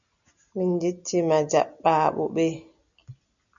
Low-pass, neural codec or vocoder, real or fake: 7.2 kHz; none; real